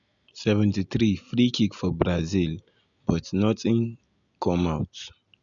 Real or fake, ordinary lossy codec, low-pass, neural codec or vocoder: real; none; 7.2 kHz; none